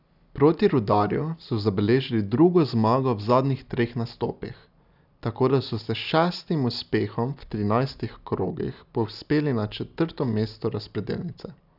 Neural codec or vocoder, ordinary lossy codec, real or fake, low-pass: none; none; real; 5.4 kHz